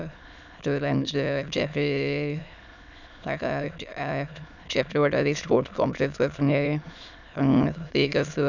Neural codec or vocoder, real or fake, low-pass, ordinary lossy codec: autoencoder, 22.05 kHz, a latent of 192 numbers a frame, VITS, trained on many speakers; fake; 7.2 kHz; none